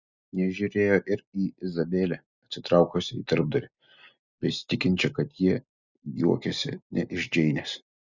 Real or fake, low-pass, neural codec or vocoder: real; 7.2 kHz; none